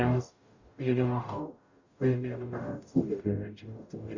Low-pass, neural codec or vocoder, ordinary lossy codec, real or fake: 7.2 kHz; codec, 44.1 kHz, 0.9 kbps, DAC; Opus, 64 kbps; fake